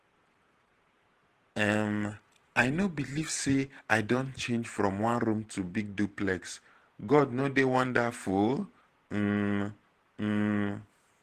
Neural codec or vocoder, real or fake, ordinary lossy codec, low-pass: vocoder, 48 kHz, 128 mel bands, Vocos; fake; Opus, 16 kbps; 14.4 kHz